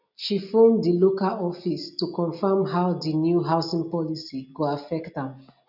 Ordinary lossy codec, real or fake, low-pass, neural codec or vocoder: none; real; 5.4 kHz; none